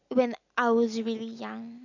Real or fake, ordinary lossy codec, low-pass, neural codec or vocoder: real; none; 7.2 kHz; none